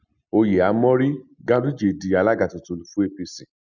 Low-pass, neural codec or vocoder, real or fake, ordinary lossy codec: 7.2 kHz; none; real; none